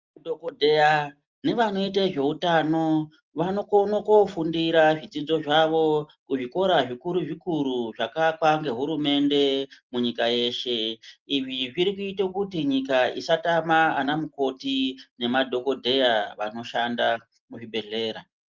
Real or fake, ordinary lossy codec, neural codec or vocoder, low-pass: real; Opus, 32 kbps; none; 7.2 kHz